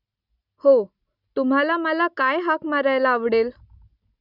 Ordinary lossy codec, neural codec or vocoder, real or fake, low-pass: none; none; real; 5.4 kHz